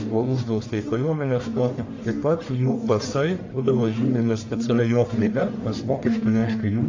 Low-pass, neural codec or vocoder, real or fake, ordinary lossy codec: 7.2 kHz; codec, 44.1 kHz, 1.7 kbps, Pupu-Codec; fake; AAC, 48 kbps